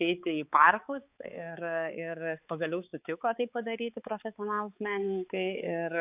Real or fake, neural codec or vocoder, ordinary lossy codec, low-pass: fake; codec, 16 kHz, 4 kbps, X-Codec, HuBERT features, trained on balanced general audio; AAC, 32 kbps; 3.6 kHz